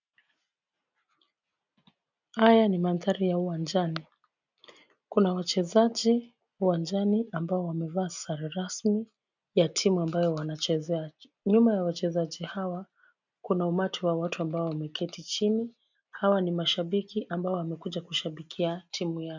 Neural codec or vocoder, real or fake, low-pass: none; real; 7.2 kHz